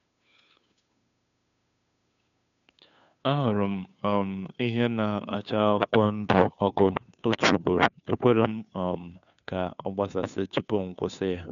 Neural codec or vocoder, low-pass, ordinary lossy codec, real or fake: codec, 16 kHz, 2 kbps, FunCodec, trained on Chinese and English, 25 frames a second; 7.2 kHz; none; fake